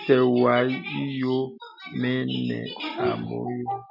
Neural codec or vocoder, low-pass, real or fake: none; 5.4 kHz; real